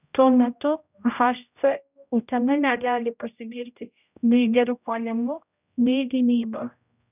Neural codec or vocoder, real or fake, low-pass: codec, 16 kHz, 0.5 kbps, X-Codec, HuBERT features, trained on general audio; fake; 3.6 kHz